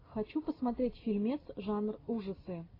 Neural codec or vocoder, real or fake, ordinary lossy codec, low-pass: none; real; AAC, 24 kbps; 5.4 kHz